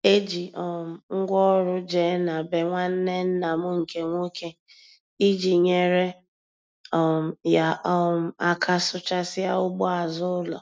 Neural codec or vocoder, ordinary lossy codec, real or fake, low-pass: none; none; real; none